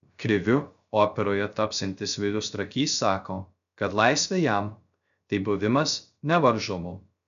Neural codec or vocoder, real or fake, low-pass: codec, 16 kHz, 0.3 kbps, FocalCodec; fake; 7.2 kHz